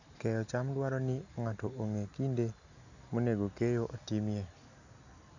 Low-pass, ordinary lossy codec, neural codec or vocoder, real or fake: 7.2 kHz; none; none; real